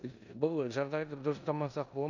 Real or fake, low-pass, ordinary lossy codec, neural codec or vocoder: fake; 7.2 kHz; none; codec, 16 kHz in and 24 kHz out, 0.9 kbps, LongCat-Audio-Codec, four codebook decoder